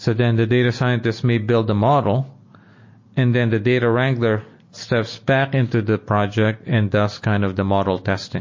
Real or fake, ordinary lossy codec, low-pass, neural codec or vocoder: real; MP3, 32 kbps; 7.2 kHz; none